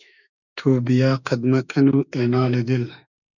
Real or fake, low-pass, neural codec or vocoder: fake; 7.2 kHz; autoencoder, 48 kHz, 32 numbers a frame, DAC-VAE, trained on Japanese speech